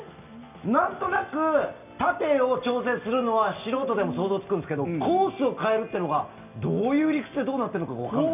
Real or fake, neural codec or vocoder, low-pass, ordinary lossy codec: real; none; 3.6 kHz; none